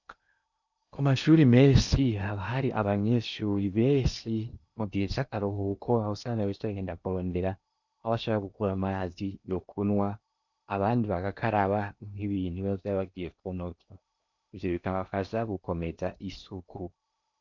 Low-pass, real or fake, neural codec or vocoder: 7.2 kHz; fake; codec, 16 kHz in and 24 kHz out, 0.8 kbps, FocalCodec, streaming, 65536 codes